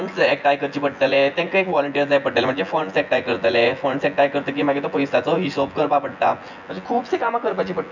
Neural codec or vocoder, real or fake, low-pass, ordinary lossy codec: vocoder, 24 kHz, 100 mel bands, Vocos; fake; 7.2 kHz; none